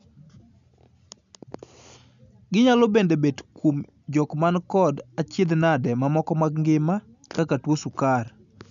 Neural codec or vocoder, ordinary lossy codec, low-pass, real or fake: none; none; 7.2 kHz; real